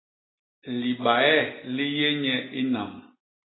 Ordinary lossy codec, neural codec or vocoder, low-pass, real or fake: AAC, 16 kbps; none; 7.2 kHz; real